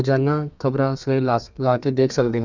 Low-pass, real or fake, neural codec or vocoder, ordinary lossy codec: 7.2 kHz; fake; codec, 32 kHz, 1.9 kbps, SNAC; none